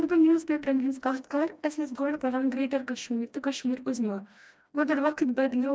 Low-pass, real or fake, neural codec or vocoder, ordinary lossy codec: none; fake; codec, 16 kHz, 1 kbps, FreqCodec, smaller model; none